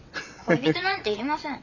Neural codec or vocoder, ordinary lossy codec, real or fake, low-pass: vocoder, 22.05 kHz, 80 mel bands, WaveNeXt; none; fake; 7.2 kHz